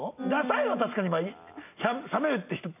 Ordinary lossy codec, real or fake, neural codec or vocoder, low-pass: none; real; none; 3.6 kHz